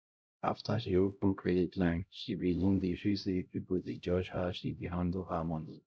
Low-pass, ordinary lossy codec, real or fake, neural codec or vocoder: none; none; fake; codec, 16 kHz, 0.5 kbps, X-Codec, HuBERT features, trained on LibriSpeech